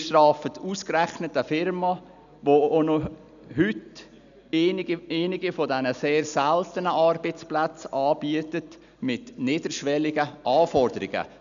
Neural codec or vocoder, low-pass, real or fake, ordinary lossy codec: none; 7.2 kHz; real; none